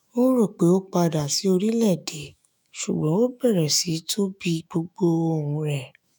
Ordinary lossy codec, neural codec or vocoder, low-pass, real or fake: none; autoencoder, 48 kHz, 128 numbers a frame, DAC-VAE, trained on Japanese speech; none; fake